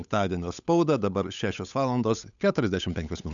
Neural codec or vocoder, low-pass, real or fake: codec, 16 kHz, 8 kbps, FunCodec, trained on Chinese and English, 25 frames a second; 7.2 kHz; fake